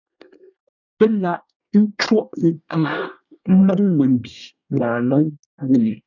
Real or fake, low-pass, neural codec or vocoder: fake; 7.2 kHz; codec, 24 kHz, 1 kbps, SNAC